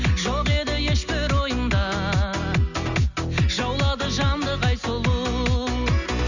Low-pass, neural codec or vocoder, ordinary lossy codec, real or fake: 7.2 kHz; none; none; real